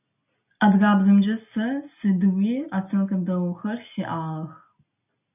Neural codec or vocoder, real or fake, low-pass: none; real; 3.6 kHz